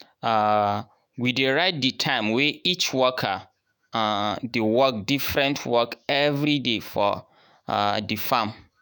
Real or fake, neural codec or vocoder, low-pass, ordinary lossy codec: fake; autoencoder, 48 kHz, 128 numbers a frame, DAC-VAE, trained on Japanese speech; none; none